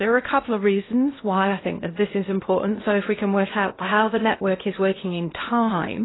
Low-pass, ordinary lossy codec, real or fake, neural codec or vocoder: 7.2 kHz; AAC, 16 kbps; fake; codec, 16 kHz in and 24 kHz out, 0.8 kbps, FocalCodec, streaming, 65536 codes